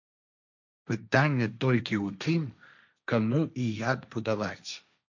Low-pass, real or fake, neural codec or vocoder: 7.2 kHz; fake; codec, 16 kHz, 1.1 kbps, Voila-Tokenizer